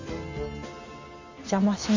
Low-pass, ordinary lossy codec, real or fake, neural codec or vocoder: 7.2 kHz; AAC, 48 kbps; real; none